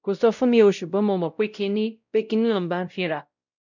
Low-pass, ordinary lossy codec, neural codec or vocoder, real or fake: 7.2 kHz; none; codec, 16 kHz, 0.5 kbps, X-Codec, WavLM features, trained on Multilingual LibriSpeech; fake